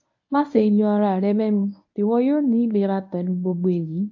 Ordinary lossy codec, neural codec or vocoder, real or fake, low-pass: AAC, 48 kbps; codec, 24 kHz, 0.9 kbps, WavTokenizer, medium speech release version 1; fake; 7.2 kHz